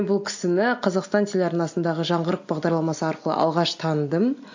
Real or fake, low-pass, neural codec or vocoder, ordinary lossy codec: real; 7.2 kHz; none; AAC, 48 kbps